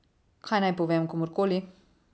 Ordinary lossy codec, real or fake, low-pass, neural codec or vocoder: none; real; none; none